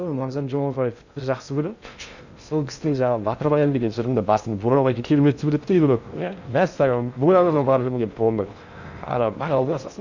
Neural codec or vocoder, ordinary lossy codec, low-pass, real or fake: codec, 16 kHz in and 24 kHz out, 0.6 kbps, FocalCodec, streaming, 2048 codes; none; 7.2 kHz; fake